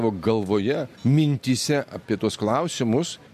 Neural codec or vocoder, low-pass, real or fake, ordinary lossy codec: none; 14.4 kHz; real; MP3, 64 kbps